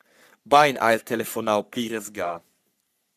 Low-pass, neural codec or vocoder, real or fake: 14.4 kHz; codec, 44.1 kHz, 3.4 kbps, Pupu-Codec; fake